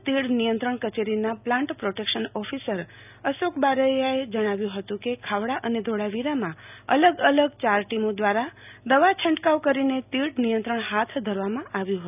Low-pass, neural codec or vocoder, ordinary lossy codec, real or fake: 3.6 kHz; none; none; real